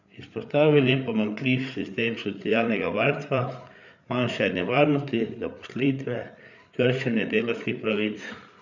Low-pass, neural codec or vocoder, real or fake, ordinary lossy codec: 7.2 kHz; codec, 16 kHz, 8 kbps, FreqCodec, larger model; fake; none